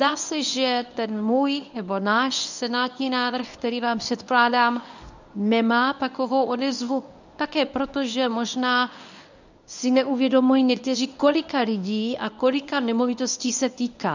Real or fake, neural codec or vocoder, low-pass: fake; codec, 24 kHz, 0.9 kbps, WavTokenizer, medium speech release version 1; 7.2 kHz